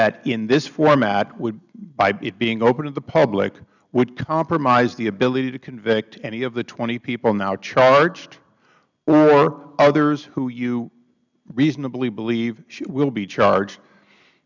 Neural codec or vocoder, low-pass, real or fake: none; 7.2 kHz; real